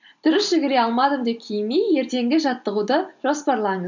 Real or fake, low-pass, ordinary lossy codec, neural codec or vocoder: real; 7.2 kHz; none; none